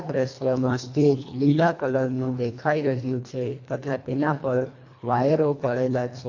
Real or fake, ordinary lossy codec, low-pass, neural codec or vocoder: fake; AAC, 48 kbps; 7.2 kHz; codec, 24 kHz, 1.5 kbps, HILCodec